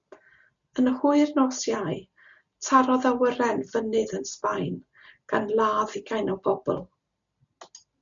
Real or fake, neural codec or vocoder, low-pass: real; none; 7.2 kHz